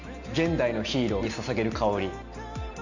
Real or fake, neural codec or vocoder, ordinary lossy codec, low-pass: real; none; none; 7.2 kHz